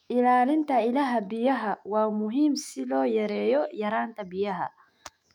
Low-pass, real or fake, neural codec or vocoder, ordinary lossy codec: 19.8 kHz; fake; autoencoder, 48 kHz, 128 numbers a frame, DAC-VAE, trained on Japanese speech; none